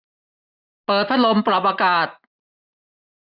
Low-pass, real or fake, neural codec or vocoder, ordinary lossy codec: 5.4 kHz; real; none; none